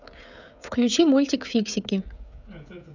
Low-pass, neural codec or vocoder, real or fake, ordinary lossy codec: 7.2 kHz; codec, 16 kHz, 16 kbps, FreqCodec, smaller model; fake; none